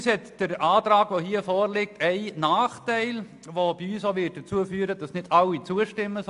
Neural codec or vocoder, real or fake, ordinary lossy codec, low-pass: none; real; AAC, 64 kbps; 10.8 kHz